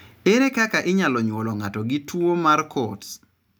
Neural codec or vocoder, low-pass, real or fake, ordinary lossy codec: none; none; real; none